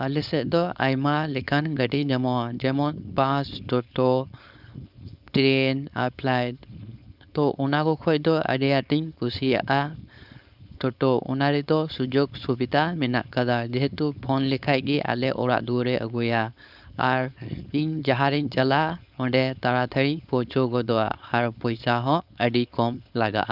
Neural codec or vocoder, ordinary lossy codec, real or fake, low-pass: codec, 16 kHz, 4.8 kbps, FACodec; none; fake; 5.4 kHz